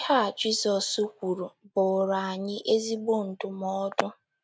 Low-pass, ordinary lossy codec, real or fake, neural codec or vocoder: none; none; real; none